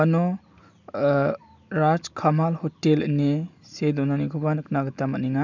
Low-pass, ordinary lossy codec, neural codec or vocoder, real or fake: 7.2 kHz; none; none; real